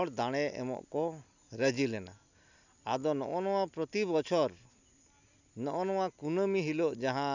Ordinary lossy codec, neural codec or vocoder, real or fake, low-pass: none; none; real; 7.2 kHz